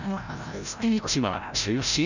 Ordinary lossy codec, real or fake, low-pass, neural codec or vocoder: none; fake; 7.2 kHz; codec, 16 kHz, 0.5 kbps, FreqCodec, larger model